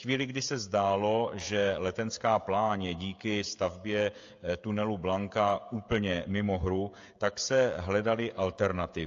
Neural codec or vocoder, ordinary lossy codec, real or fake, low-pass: codec, 16 kHz, 16 kbps, FreqCodec, smaller model; AAC, 48 kbps; fake; 7.2 kHz